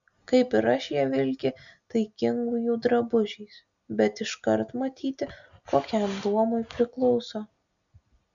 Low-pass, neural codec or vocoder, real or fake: 7.2 kHz; none; real